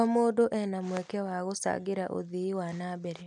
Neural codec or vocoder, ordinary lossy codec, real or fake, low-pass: none; none; real; 10.8 kHz